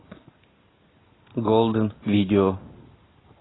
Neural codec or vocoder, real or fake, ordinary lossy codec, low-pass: none; real; AAC, 16 kbps; 7.2 kHz